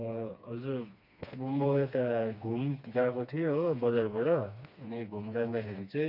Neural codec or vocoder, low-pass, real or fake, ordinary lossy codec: codec, 16 kHz, 2 kbps, FreqCodec, smaller model; 5.4 kHz; fake; none